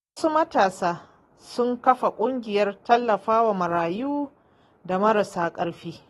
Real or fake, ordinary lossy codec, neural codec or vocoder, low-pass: real; AAC, 32 kbps; none; 14.4 kHz